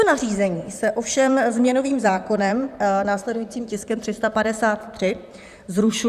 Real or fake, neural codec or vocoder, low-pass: fake; vocoder, 44.1 kHz, 128 mel bands, Pupu-Vocoder; 14.4 kHz